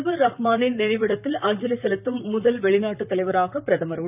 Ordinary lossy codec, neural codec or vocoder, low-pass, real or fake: none; vocoder, 44.1 kHz, 128 mel bands, Pupu-Vocoder; 3.6 kHz; fake